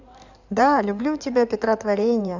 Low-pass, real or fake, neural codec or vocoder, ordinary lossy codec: 7.2 kHz; fake; codec, 16 kHz in and 24 kHz out, 2.2 kbps, FireRedTTS-2 codec; none